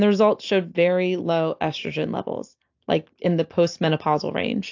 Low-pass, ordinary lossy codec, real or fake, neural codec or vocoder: 7.2 kHz; AAC, 48 kbps; real; none